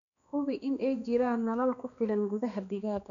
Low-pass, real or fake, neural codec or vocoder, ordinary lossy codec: 7.2 kHz; fake; codec, 16 kHz, 2 kbps, X-Codec, HuBERT features, trained on balanced general audio; none